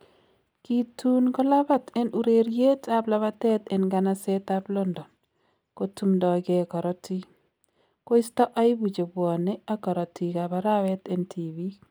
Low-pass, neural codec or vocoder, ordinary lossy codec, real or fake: none; none; none; real